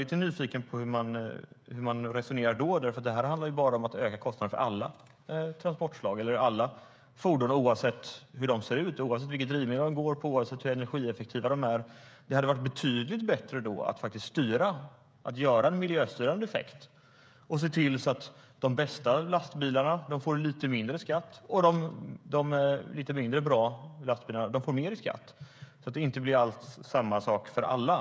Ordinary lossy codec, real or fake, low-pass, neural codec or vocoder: none; fake; none; codec, 16 kHz, 16 kbps, FreqCodec, smaller model